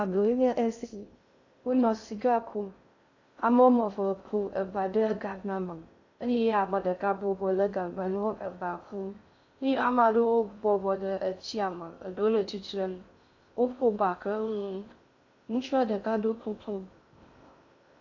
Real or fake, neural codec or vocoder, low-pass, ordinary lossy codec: fake; codec, 16 kHz in and 24 kHz out, 0.6 kbps, FocalCodec, streaming, 2048 codes; 7.2 kHz; AAC, 48 kbps